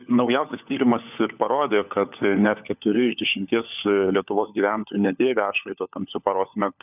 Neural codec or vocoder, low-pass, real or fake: codec, 16 kHz, 4 kbps, FunCodec, trained on LibriTTS, 50 frames a second; 3.6 kHz; fake